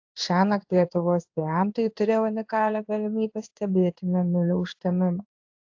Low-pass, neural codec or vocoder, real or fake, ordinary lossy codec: 7.2 kHz; codec, 16 kHz in and 24 kHz out, 1 kbps, XY-Tokenizer; fake; AAC, 48 kbps